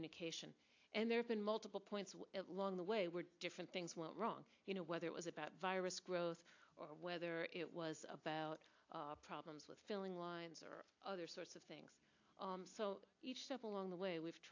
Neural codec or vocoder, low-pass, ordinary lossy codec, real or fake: none; 7.2 kHz; AAC, 48 kbps; real